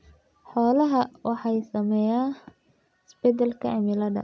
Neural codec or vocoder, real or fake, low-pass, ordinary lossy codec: none; real; none; none